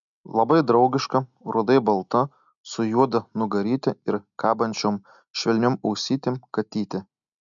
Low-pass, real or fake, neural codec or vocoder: 7.2 kHz; real; none